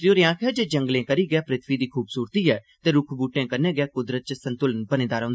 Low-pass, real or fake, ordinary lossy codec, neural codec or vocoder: none; real; none; none